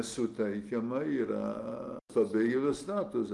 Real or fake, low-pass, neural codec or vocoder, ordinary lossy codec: real; 10.8 kHz; none; Opus, 24 kbps